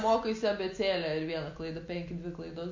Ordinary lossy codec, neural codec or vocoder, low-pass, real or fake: MP3, 48 kbps; none; 7.2 kHz; real